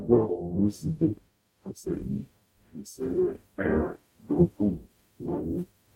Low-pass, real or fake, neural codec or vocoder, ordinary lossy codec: 19.8 kHz; fake; codec, 44.1 kHz, 0.9 kbps, DAC; MP3, 64 kbps